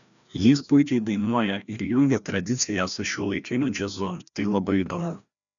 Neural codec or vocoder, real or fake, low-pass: codec, 16 kHz, 1 kbps, FreqCodec, larger model; fake; 7.2 kHz